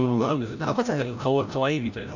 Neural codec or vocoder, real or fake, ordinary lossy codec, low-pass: codec, 16 kHz, 0.5 kbps, FreqCodec, larger model; fake; none; 7.2 kHz